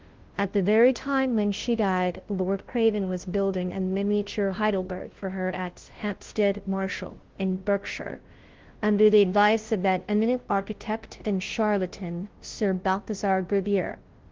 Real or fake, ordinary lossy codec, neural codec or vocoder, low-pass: fake; Opus, 16 kbps; codec, 16 kHz, 0.5 kbps, FunCodec, trained on Chinese and English, 25 frames a second; 7.2 kHz